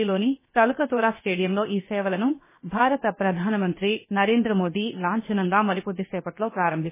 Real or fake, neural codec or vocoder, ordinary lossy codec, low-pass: fake; codec, 16 kHz, 0.7 kbps, FocalCodec; MP3, 16 kbps; 3.6 kHz